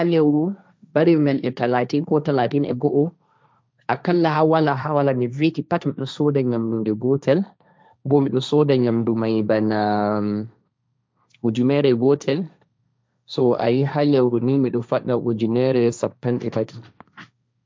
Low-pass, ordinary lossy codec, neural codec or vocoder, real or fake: none; none; codec, 16 kHz, 1.1 kbps, Voila-Tokenizer; fake